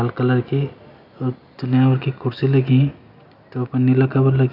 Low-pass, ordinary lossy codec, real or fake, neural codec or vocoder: 5.4 kHz; none; real; none